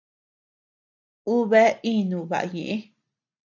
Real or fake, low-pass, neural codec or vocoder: real; 7.2 kHz; none